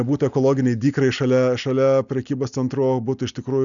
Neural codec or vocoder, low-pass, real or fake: none; 7.2 kHz; real